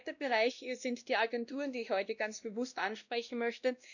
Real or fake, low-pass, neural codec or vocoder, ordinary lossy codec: fake; 7.2 kHz; codec, 16 kHz, 1 kbps, X-Codec, WavLM features, trained on Multilingual LibriSpeech; none